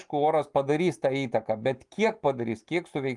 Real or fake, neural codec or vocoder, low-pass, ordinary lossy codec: real; none; 10.8 kHz; Opus, 32 kbps